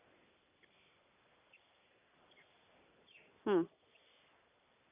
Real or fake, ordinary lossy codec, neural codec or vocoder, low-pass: real; none; none; 3.6 kHz